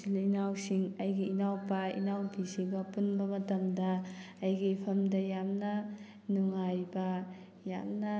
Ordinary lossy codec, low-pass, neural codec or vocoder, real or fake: none; none; none; real